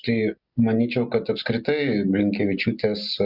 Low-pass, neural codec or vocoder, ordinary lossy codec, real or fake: 5.4 kHz; none; Opus, 64 kbps; real